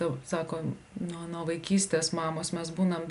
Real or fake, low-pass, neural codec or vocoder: real; 10.8 kHz; none